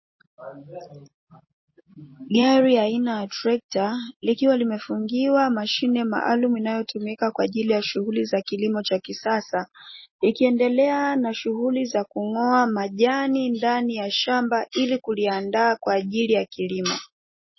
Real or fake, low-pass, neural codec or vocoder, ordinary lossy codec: real; 7.2 kHz; none; MP3, 24 kbps